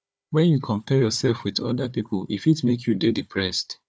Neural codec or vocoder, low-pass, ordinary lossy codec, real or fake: codec, 16 kHz, 4 kbps, FunCodec, trained on Chinese and English, 50 frames a second; none; none; fake